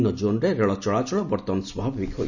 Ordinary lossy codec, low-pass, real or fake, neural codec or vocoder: none; 7.2 kHz; real; none